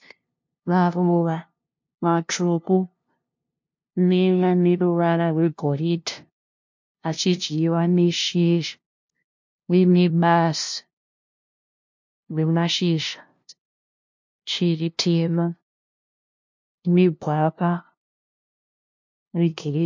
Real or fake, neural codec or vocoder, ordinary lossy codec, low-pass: fake; codec, 16 kHz, 0.5 kbps, FunCodec, trained on LibriTTS, 25 frames a second; MP3, 48 kbps; 7.2 kHz